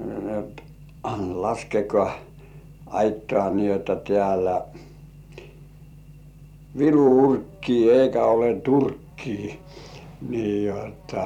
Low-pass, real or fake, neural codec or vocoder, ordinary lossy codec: 19.8 kHz; fake; vocoder, 44.1 kHz, 128 mel bands every 256 samples, BigVGAN v2; none